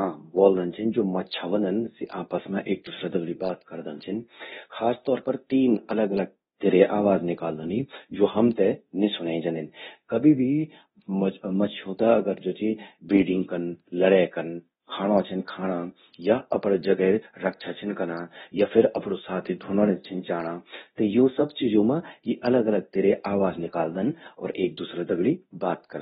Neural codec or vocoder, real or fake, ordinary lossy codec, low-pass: codec, 24 kHz, 0.9 kbps, DualCodec; fake; AAC, 16 kbps; 10.8 kHz